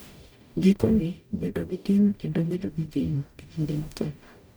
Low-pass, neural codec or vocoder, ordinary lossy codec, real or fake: none; codec, 44.1 kHz, 0.9 kbps, DAC; none; fake